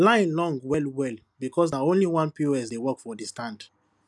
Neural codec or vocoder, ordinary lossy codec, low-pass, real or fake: none; none; none; real